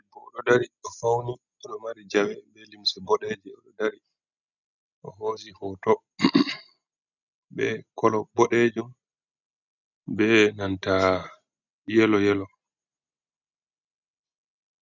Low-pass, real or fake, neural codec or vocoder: 7.2 kHz; real; none